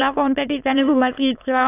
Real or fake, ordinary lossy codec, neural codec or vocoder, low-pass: fake; AAC, 24 kbps; autoencoder, 22.05 kHz, a latent of 192 numbers a frame, VITS, trained on many speakers; 3.6 kHz